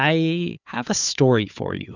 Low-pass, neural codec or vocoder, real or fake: 7.2 kHz; codec, 16 kHz, 4 kbps, FunCodec, trained on Chinese and English, 50 frames a second; fake